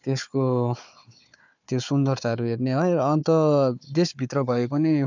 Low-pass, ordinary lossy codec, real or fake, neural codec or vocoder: 7.2 kHz; none; fake; codec, 16 kHz, 4 kbps, FunCodec, trained on Chinese and English, 50 frames a second